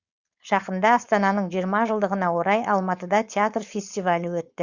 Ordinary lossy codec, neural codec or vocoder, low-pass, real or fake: Opus, 64 kbps; codec, 16 kHz, 4.8 kbps, FACodec; 7.2 kHz; fake